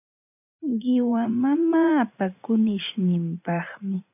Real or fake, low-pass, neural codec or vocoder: fake; 3.6 kHz; vocoder, 44.1 kHz, 80 mel bands, Vocos